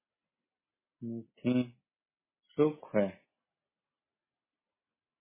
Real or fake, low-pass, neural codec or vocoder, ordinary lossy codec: real; 3.6 kHz; none; MP3, 16 kbps